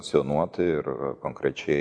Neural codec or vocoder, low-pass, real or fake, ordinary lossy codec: none; 9.9 kHz; real; MP3, 64 kbps